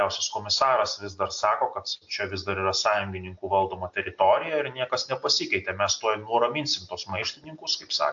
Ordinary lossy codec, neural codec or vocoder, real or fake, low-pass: AAC, 64 kbps; none; real; 7.2 kHz